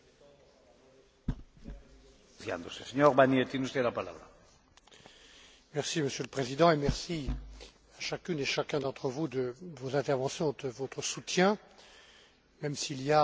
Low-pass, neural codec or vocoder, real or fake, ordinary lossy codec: none; none; real; none